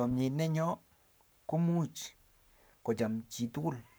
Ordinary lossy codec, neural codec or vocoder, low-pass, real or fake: none; codec, 44.1 kHz, 7.8 kbps, Pupu-Codec; none; fake